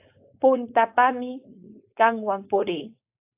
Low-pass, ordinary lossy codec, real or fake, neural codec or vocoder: 3.6 kHz; AAC, 32 kbps; fake; codec, 16 kHz, 4.8 kbps, FACodec